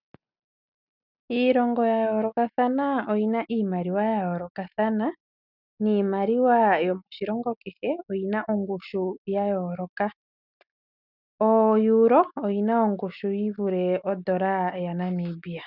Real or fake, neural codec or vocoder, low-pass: real; none; 5.4 kHz